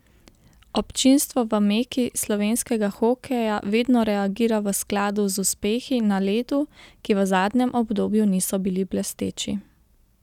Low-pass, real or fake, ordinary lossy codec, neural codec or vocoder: 19.8 kHz; real; none; none